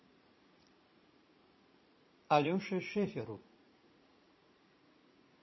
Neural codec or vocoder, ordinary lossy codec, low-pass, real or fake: vocoder, 44.1 kHz, 80 mel bands, Vocos; MP3, 24 kbps; 7.2 kHz; fake